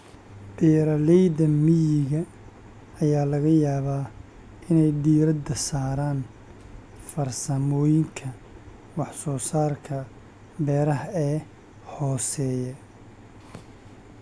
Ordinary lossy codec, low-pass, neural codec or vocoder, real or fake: none; none; none; real